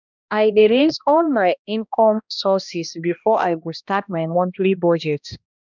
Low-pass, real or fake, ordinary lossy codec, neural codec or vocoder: 7.2 kHz; fake; none; codec, 16 kHz, 1 kbps, X-Codec, HuBERT features, trained on balanced general audio